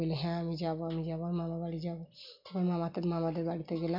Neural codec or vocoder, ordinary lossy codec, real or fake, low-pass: none; MP3, 48 kbps; real; 5.4 kHz